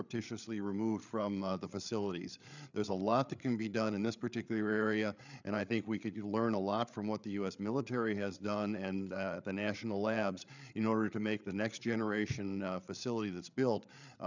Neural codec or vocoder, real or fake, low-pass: codec, 16 kHz, 8 kbps, FreqCodec, larger model; fake; 7.2 kHz